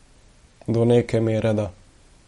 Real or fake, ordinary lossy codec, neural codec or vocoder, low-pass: real; MP3, 48 kbps; none; 19.8 kHz